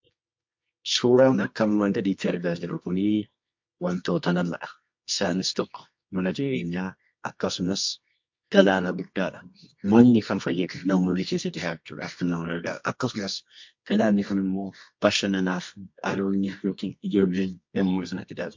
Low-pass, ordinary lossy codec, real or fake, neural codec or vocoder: 7.2 kHz; MP3, 48 kbps; fake; codec, 24 kHz, 0.9 kbps, WavTokenizer, medium music audio release